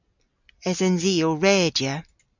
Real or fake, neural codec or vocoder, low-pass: real; none; 7.2 kHz